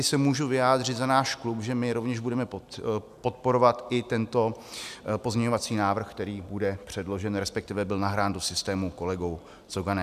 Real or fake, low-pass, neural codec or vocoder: real; 14.4 kHz; none